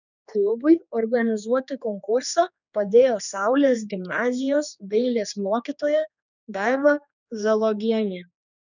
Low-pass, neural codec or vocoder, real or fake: 7.2 kHz; codec, 16 kHz, 2 kbps, X-Codec, HuBERT features, trained on general audio; fake